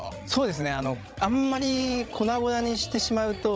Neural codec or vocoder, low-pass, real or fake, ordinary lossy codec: codec, 16 kHz, 16 kbps, FreqCodec, larger model; none; fake; none